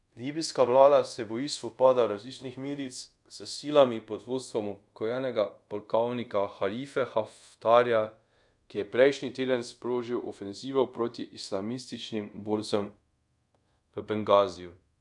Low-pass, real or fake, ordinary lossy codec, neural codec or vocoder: 10.8 kHz; fake; none; codec, 24 kHz, 0.5 kbps, DualCodec